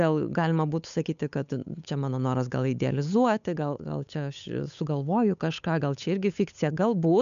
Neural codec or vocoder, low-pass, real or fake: codec, 16 kHz, 8 kbps, FunCodec, trained on Chinese and English, 25 frames a second; 7.2 kHz; fake